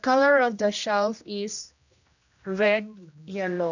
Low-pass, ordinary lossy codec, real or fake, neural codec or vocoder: 7.2 kHz; none; fake; codec, 16 kHz, 1 kbps, X-Codec, HuBERT features, trained on general audio